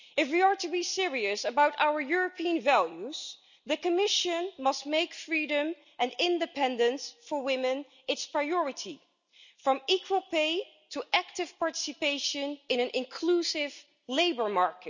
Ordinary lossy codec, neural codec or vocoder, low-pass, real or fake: none; none; 7.2 kHz; real